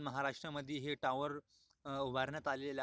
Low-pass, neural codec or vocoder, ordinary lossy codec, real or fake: none; none; none; real